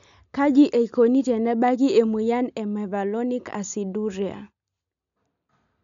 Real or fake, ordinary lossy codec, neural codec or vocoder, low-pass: real; none; none; 7.2 kHz